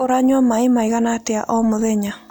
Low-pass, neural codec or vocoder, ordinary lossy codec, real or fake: none; none; none; real